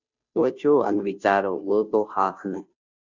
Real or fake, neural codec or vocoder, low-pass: fake; codec, 16 kHz, 0.5 kbps, FunCodec, trained on Chinese and English, 25 frames a second; 7.2 kHz